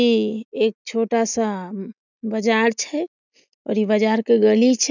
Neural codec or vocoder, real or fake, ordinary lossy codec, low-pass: none; real; none; 7.2 kHz